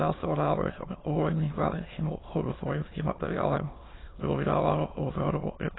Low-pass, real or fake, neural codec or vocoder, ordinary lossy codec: 7.2 kHz; fake; autoencoder, 22.05 kHz, a latent of 192 numbers a frame, VITS, trained on many speakers; AAC, 16 kbps